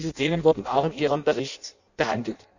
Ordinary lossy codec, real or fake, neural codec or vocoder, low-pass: none; fake; codec, 16 kHz in and 24 kHz out, 0.6 kbps, FireRedTTS-2 codec; 7.2 kHz